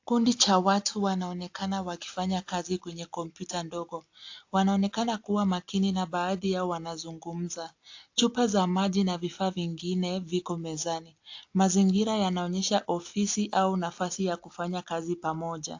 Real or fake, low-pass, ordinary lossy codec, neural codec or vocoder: real; 7.2 kHz; AAC, 48 kbps; none